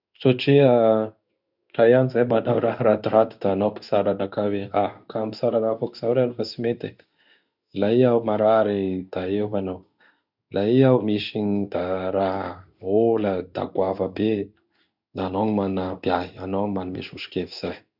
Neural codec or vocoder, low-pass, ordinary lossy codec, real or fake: codec, 16 kHz in and 24 kHz out, 1 kbps, XY-Tokenizer; 5.4 kHz; none; fake